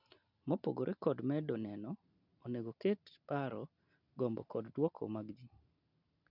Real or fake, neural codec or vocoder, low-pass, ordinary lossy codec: real; none; 5.4 kHz; none